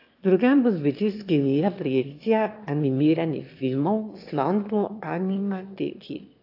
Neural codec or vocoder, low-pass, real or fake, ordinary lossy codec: autoencoder, 22.05 kHz, a latent of 192 numbers a frame, VITS, trained on one speaker; 5.4 kHz; fake; AAC, 32 kbps